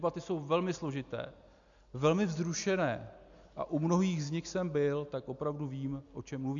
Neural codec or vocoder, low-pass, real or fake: none; 7.2 kHz; real